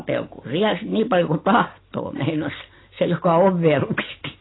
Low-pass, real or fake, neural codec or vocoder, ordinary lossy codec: 7.2 kHz; real; none; AAC, 16 kbps